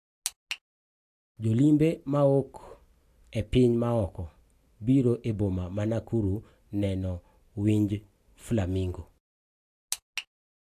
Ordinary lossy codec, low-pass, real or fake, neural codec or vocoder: AAC, 64 kbps; 14.4 kHz; real; none